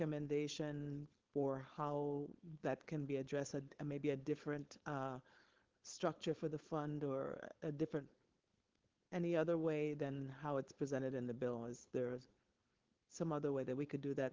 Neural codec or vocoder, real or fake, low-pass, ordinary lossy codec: codec, 16 kHz, 4.8 kbps, FACodec; fake; 7.2 kHz; Opus, 16 kbps